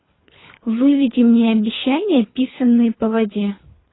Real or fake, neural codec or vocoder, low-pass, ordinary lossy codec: fake; codec, 24 kHz, 3 kbps, HILCodec; 7.2 kHz; AAC, 16 kbps